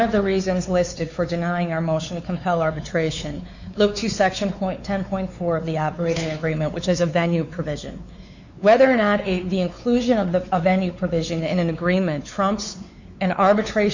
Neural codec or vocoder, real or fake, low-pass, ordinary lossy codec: codec, 16 kHz, 4 kbps, X-Codec, WavLM features, trained on Multilingual LibriSpeech; fake; 7.2 kHz; Opus, 64 kbps